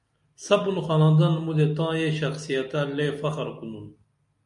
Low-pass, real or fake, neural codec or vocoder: 10.8 kHz; real; none